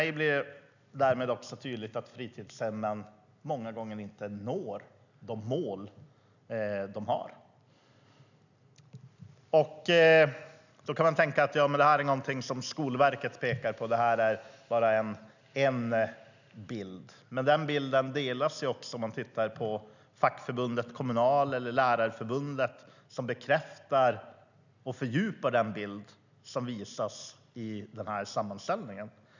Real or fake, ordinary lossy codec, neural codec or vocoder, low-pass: real; none; none; 7.2 kHz